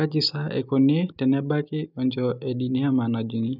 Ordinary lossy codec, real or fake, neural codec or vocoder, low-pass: none; real; none; 5.4 kHz